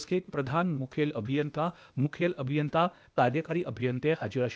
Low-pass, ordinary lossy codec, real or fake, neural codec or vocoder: none; none; fake; codec, 16 kHz, 0.8 kbps, ZipCodec